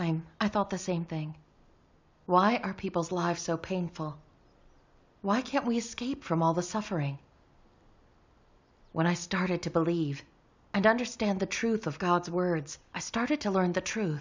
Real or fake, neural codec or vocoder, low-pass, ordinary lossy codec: real; none; 7.2 kHz; MP3, 64 kbps